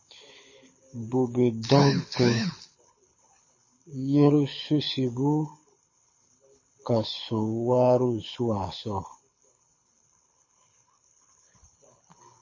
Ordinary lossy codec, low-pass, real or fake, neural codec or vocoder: MP3, 32 kbps; 7.2 kHz; fake; codec, 24 kHz, 6 kbps, HILCodec